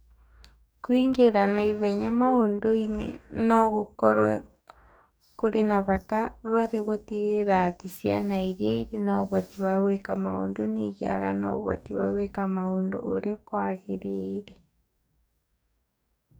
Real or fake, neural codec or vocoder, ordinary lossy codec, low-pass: fake; codec, 44.1 kHz, 2.6 kbps, DAC; none; none